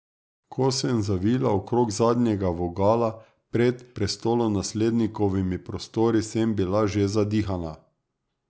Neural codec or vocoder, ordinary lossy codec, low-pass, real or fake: none; none; none; real